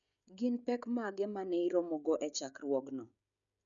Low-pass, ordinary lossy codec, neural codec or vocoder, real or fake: 7.2 kHz; none; codec, 16 kHz, 16 kbps, FreqCodec, smaller model; fake